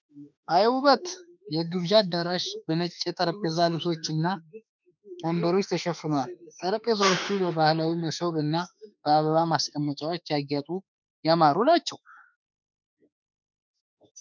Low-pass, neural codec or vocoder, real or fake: 7.2 kHz; autoencoder, 48 kHz, 32 numbers a frame, DAC-VAE, trained on Japanese speech; fake